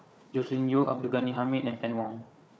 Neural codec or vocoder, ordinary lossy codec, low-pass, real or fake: codec, 16 kHz, 4 kbps, FunCodec, trained on Chinese and English, 50 frames a second; none; none; fake